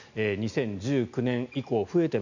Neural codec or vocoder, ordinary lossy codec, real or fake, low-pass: none; none; real; 7.2 kHz